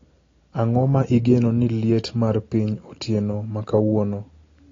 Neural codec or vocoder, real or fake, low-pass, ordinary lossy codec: none; real; 7.2 kHz; AAC, 32 kbps